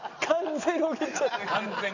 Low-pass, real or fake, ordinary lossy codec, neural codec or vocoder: 7.2 kHz; real; none; none